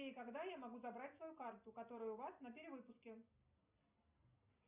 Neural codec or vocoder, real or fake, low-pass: none; real; 3.6 kHz